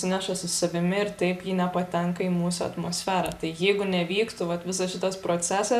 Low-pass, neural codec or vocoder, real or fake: 14.4 kHz; none; real